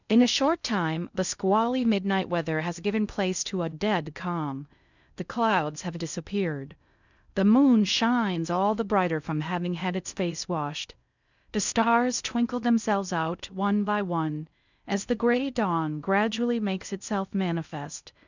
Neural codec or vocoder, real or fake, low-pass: codec, 16 kHz in and 24 kHz out, 0.6 kbps, FocalCodec, streaming, 2048 codes; fake; 7.2 kHz